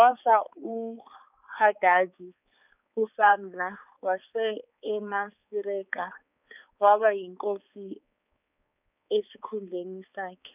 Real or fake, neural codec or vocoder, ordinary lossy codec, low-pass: fake; codec, 16 kHz, 4 kbps, X-Codec, HuBERT features, trained on balanced general audio; none; 3.6 kHz